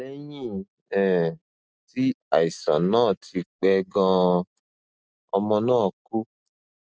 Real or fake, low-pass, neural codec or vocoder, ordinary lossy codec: real; none; none; none